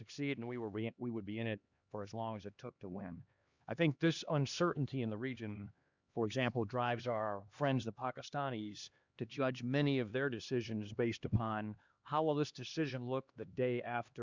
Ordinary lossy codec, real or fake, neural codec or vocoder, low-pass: Opus, 64 kbps; fake; codec, 16 kHz, 2 kbps, X-Codec, HuBERT features, trained on LibriSpeech; 7.2 kHz